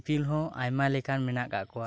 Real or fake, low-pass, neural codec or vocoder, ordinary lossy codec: real; none; none; none